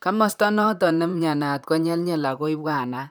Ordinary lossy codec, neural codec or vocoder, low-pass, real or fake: none; vocoder, 44.1 kHz, 128 mel bands, Pupu-Vocoder; none; fake